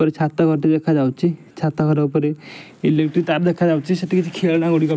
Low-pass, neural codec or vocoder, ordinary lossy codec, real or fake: none; none; none; real